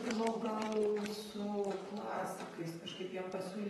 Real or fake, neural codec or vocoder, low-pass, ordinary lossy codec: fake; vocoder, 44.1 kHz, 128 mel bands, Pupu-Vocoder; 19.8 kHz; AAC, 32 kbps